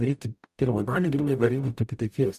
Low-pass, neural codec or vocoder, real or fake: 14.4 kHz; codec, 44.1 kHz, 0.9 kbps, DAC; fake